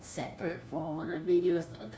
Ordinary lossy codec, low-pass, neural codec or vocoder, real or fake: none; none; codec, 16 kHz, 1 kbps, FunCodec, trained on LibriTTS, 50 frames a second; fake